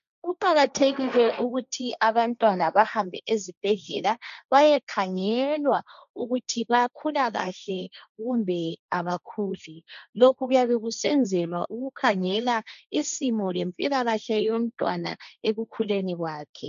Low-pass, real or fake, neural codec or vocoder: 7.2 kHz; fake; codec, 16 kHz, 1.1 kbps, Voila-Tokenizer